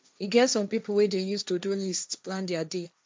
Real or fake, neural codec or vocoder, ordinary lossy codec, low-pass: fake; codec, 16 kHz, 1.1 kbps, Voila-Tokenizer; none; none